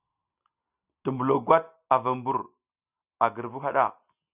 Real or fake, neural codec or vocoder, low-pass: real; none; 3.6 kHz